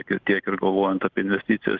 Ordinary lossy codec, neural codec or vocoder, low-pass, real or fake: Opus, 32 kbps; none; 7.2 kHz; real